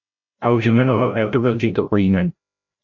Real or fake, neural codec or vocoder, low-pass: fake; codec, 16 kHz, 0.5 kbps, FreqCodec, larger model; 7.2 kHz